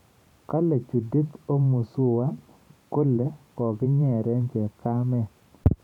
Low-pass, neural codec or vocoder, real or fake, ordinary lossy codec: 19.8 kHz; vocoder, 44.1 kHz, 128 mel bands every 512 samples, BigVGAN v2; fake; none